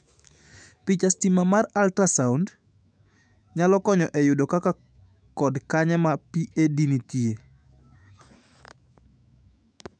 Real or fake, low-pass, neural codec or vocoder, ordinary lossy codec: fake; 9.9 kHz; autoencoder, 48 kHz, 128 numbers a frame, DAC-VAE, trained on Japanese speech; none